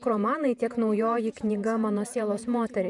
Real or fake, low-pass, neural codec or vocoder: fake; 10.8 kHz; vocoder, 48 kHz, 128 mel bands, Vocos